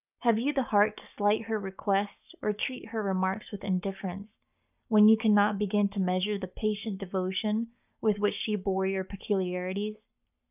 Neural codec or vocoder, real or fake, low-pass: codec, 44.1 kHz, 7.8 kbps, Pupu-Codec; fake; 3.6 kHz